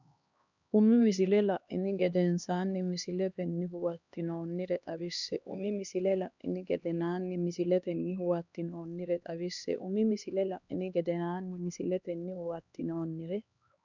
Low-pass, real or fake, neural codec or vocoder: 7.2 kHz; fake; codec, 16 kHz, 2 kbps, X-Codec, HuBERT features, trained on LibriSpeech